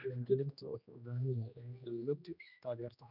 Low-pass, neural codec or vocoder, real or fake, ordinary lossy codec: 5.4 kHz; codec, 16 kHz, 1 kbps, X-Codec, HuBERT features, trained on general audio; fake; none